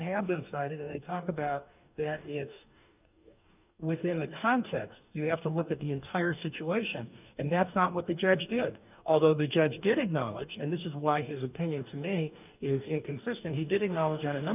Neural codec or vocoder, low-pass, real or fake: codec, 44.1 kHz, 2.6 kbps, DAC; 3.6 kHz; fake